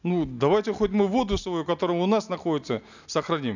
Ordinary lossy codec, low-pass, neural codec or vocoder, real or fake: none; 7.2 kHz; none; real